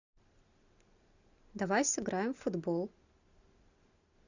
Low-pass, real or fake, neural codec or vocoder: 7.2 kHz; real; none